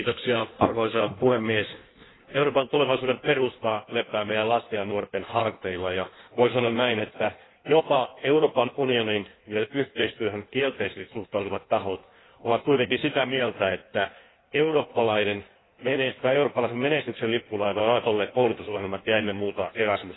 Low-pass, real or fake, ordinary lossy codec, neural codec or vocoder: 7.2 kHz; fake; AAC, 16 kbps; codec, 16 kHz in and 24 kHz out, 1.1 kbps, FireRedTTS-2 codec